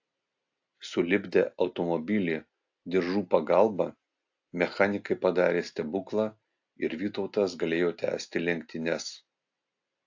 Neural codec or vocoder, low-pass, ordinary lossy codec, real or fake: none; 7.2 kHz; AAC, 48 kbps; real